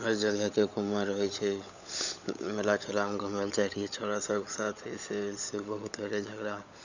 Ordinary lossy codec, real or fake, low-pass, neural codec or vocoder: none; fake; 7.2 kHz; codec, 16 kHz, 16 kbps, FunCodec, trained on LibriTTS, 50 frames a second